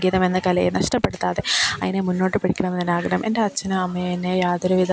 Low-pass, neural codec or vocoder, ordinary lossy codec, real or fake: none; none; none; real